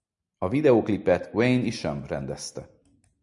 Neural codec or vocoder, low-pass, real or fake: none; 10.8 kHz; real